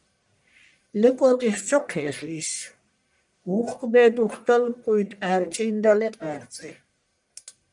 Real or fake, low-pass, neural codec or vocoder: fake; 10.8 kHz; codec, 44.1 kHz, 1.7 kbps, Pupu-Codec